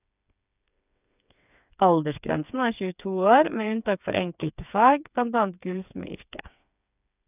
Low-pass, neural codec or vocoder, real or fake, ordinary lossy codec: 3.6 kHz; codec, 16 kHz, 4 kbps, FreqCodec, smaller model; fake; none